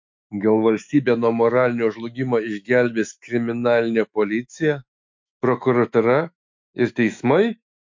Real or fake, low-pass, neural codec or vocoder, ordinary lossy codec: fake; 7.2 kHz; autoencoder, 48 kHz, 128 numbers a frame, DAC-VAE, trained on Japanese speech; MP3, 48 kbps